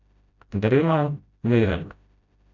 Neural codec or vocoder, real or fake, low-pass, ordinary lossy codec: codec, 16 kHz, 0.5 kbps, FreqCodec, smaller model; fake; 7.2 kHz; none